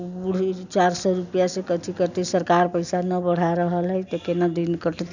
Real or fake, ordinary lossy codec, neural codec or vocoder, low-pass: real; none; none; 7.2 kHz